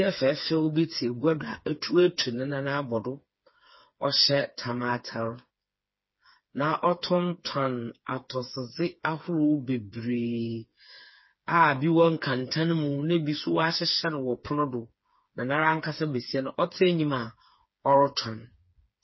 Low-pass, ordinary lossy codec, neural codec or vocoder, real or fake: 7.2 kHz; MP3, 24 kbps; codec, 16 kHz, 4 kbps, FreqCodec, smaller model; fake